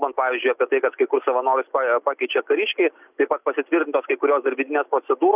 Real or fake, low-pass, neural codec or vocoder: real; 3.6 kHz; none